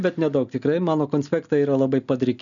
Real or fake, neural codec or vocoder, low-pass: real; none; 7.2 kHz